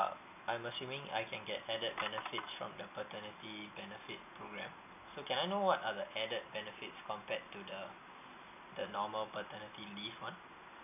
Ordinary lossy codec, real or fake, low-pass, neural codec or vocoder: none; real; 3.6 kHz; none